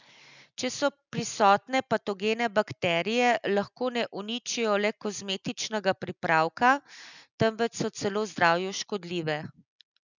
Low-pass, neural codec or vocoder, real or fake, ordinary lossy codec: 7.2 kHz; none; real; none